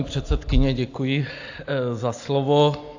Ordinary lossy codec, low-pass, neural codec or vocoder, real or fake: AAC, 48 kbps; 7.2 kHz; none; real